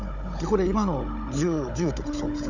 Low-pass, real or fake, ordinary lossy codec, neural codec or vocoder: 7.2 kHz; fake; none; codec, 16 kHz, 16 kbps, FunCodec, trained on Chinese and English, 50 frames a second